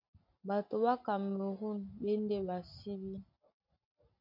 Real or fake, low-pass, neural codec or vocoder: real; 5.4 kHz; none